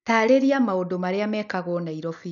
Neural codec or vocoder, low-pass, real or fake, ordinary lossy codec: none; 7.2 kHz; real; none